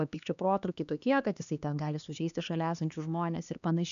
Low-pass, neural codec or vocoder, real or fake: 7.2 kHz; codec, 16 kHz, 2 kbps, X-Codec, HuBERT features, trained on LibriSpeech; fake